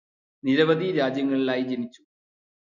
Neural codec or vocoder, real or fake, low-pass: none; real; 7.2 kHz